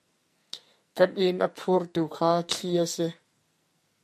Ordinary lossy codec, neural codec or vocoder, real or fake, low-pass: MP3, 64 kbps; codec, 44.1 kHz, 2.6 kbps, SNAC; fake; 14.4 kHz